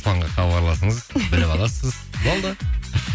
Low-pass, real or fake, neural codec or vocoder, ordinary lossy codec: none; real; none; none